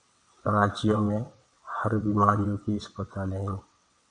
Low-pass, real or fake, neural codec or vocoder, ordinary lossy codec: 9.9 kHz; fake; vocoder, 22.05 kHz, 80 mel bands, WaveNeXt; MP3, 64 kbps